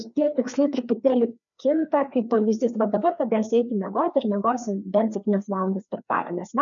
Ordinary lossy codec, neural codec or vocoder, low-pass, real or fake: MP3, 96 kbps; codec, 16 kHz, 2 kbps, FreqCodec, larger model; 7.2 kHz; fake